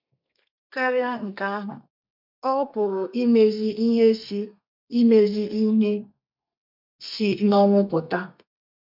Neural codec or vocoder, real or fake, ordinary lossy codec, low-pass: codec, 32 kHz, 1.9 kbps, SNAC; fake; MP3, 48 kbps; 5.4 kHz